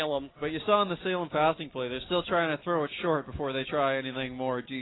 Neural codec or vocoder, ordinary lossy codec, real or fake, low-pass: none; AAC, 16 kbps; real; 7.2 kHz